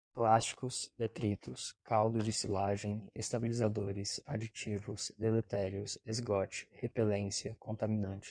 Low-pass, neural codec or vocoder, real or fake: 9.9 kHz; codec, 16 kHz in and 24 kHz out, 1.1 kbps, FireRedTTS-2 codec; fake